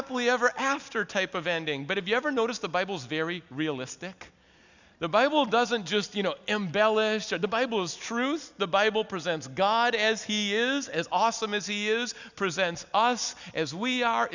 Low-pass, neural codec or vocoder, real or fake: 7.2 kHz; none; real